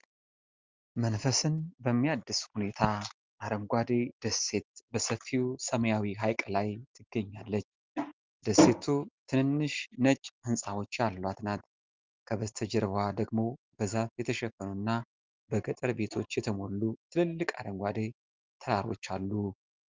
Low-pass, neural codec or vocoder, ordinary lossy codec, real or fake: 7.2 kHz; none; Opus, 32 kbps; real